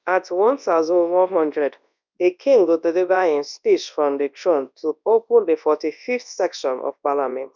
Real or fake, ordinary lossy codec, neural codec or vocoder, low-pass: fake; none; codec, 24 kHz, 0.9 kbps, WavTokenizer, large speech release; 7.2 kHz